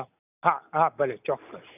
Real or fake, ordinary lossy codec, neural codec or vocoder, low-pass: real; none; none; 3.6 kHz